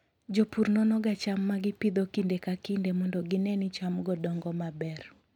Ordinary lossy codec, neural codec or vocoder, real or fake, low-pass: none; none; real; 19.8 kHz